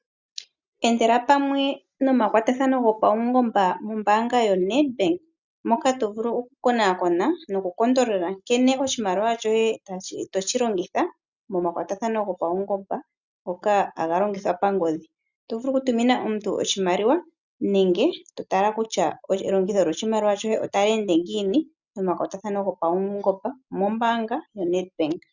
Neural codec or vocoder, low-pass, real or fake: none; 7.2 kHz; real